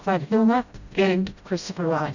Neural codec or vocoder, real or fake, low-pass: codec, 16 kHz, 0.5 kbps, FreqCodec, smaller model; fake; 7.2 kHz